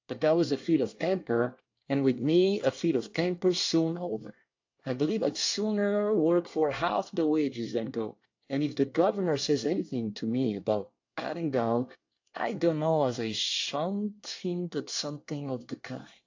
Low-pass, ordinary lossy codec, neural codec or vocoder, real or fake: 7.2 kHz; AAC, 48 kbps; codec, 24 kHz, 1 kbps, SNAC; fake